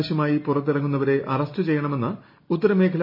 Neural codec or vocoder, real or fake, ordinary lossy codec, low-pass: none; real; MP3, 24 kbps; 5.4 kHz